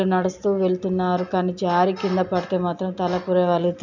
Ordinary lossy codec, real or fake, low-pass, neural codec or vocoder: none; real; 7.2 kHz; none